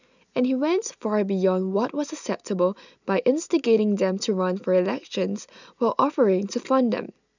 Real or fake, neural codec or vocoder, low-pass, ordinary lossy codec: real; none; 7.2 kHz; none